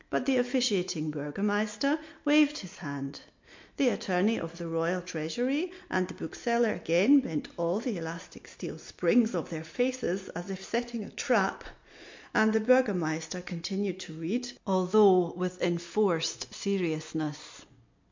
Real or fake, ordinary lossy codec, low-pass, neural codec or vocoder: real; MP3, 48 kbps; 7.2 kHz; none